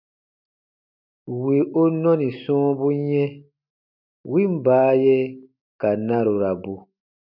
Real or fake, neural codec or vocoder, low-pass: real; none; 5.4 kHz